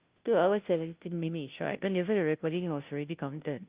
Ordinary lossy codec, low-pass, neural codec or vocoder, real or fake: Opus, 32 kbps; 3.6 kHz; codec, 16 kHz, 0.5 kbps, FunCodec, trained on Chinese and English, 25 frames a second; fake